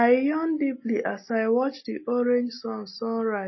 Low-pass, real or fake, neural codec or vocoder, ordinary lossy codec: 7.2 kHz; real; none; MP3, 24 kbps